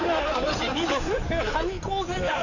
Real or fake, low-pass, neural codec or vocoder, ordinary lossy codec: fake; 7.2 kHz; codec, 16 kHz in and 24 kHz out, 2.2 kbps, FireRedTTS-2 codec; none